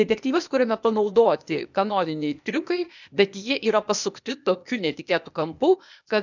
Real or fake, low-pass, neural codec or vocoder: fake; 7.2 kHz; codec, 16 kHz, 0.8 kbps, ZipCodec